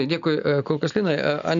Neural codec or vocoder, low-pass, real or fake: none; 7.2 kHz; real